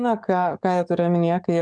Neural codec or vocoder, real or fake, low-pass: codec, 44.1 kHz, 7.8 kbps, DAC; fake; 9.9 kHz